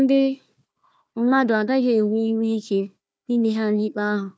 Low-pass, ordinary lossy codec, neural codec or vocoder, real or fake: none; none; codec, 16 kHz, 1 kbps, FunCodec, trained on Chinese and English, 50 frames a second; fake